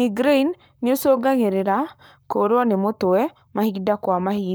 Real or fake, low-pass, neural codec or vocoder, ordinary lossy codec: fake; none; codec, 44.1 kHz, 7.8 kbps, Pupu-Codec; none